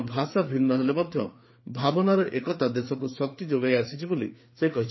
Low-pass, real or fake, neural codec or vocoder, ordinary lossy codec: 7.2 kHz; fake; codec, 16 kHz, 4 kbps, FreqCodec, larger model; MP3, 24 kbps